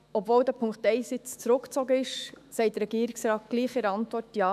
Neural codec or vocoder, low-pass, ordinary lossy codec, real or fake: autoencoder, 48 kHz, 128 numbers a frame, DAC-VAE, trained on Japanese speech; 14.4 kHz; none; fake